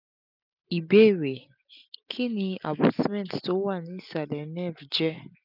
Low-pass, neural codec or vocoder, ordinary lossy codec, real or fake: 5.4 kHz; none; none; real